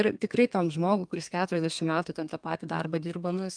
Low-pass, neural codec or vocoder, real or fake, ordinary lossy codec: 9.9 kHz; codec, 32 kHz, 1.9 kbps, SNAC; fake; Opus, 32 kbps